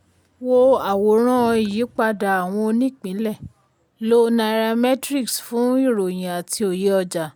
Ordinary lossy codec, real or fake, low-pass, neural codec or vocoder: none; real; none; none